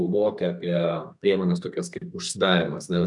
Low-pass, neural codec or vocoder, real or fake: 10.8 kHz; codec, 32 kHz, 1.9 kbps, SNAC; fake